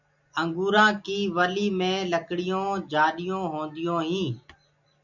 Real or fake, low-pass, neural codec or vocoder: real; 7.2 kHz; none